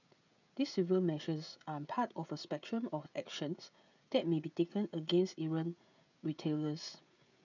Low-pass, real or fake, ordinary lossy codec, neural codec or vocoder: 7.2 kHz; fake; none; codec, 16 kHz, 16 kbps, FreqCodec, smaller model